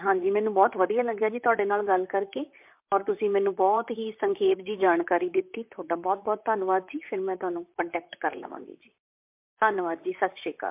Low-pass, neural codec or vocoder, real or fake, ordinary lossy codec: 3.6 kHz; vocoder, 44.1 kHz, 128 mel bands, Pupu-Vocoder; fake; MP3, 32 kbps